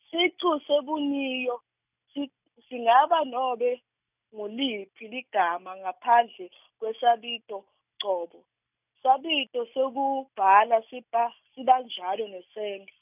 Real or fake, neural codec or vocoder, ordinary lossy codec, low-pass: real; none; none; 3.6 kHz